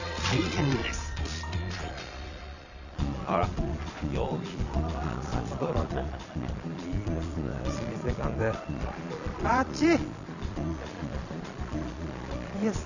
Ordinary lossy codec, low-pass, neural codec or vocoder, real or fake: none; 7.2 kHz; vocoder, 22.05 kHz, 80 mel bands, Vocos; fake